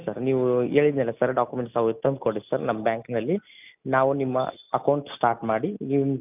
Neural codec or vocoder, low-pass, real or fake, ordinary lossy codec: none; 3.6 kHz; real; none